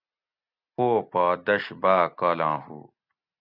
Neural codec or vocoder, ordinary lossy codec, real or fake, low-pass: none; Opus, 64 kbps; real; 5.4 kHz